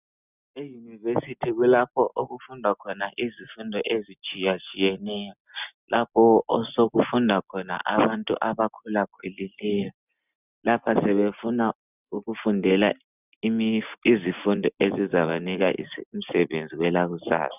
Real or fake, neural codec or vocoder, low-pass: real; none; 3.6 kHz